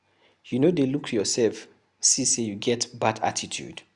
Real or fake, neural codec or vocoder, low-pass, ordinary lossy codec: real; none; 10.8 kHz; Opus, 64 kbps